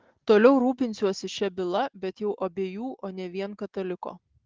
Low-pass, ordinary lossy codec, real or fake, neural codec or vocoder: 7.2 kHz; Opus, 16 kbps; real; none